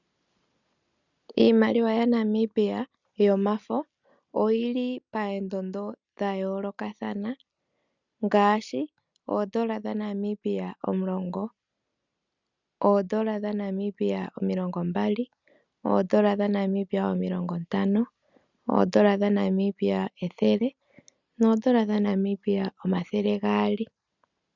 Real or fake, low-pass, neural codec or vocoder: real; 7.2 kHz; none